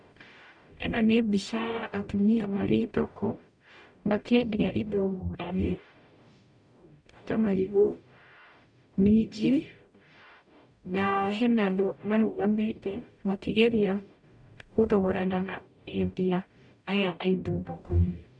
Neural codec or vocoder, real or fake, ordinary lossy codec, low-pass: codec, 44.1 kHz, 0.9 kbps, DAC; fake; none; 9.9 kHz